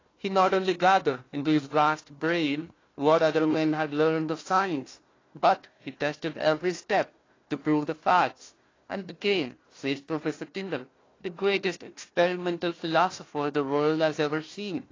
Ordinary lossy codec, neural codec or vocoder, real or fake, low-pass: AAC, 32 kbps; codec, 16 kHz, 1 kbps, FunCodec, trained on Chinese and English, 50 frames a second; fake; 7.2 kHz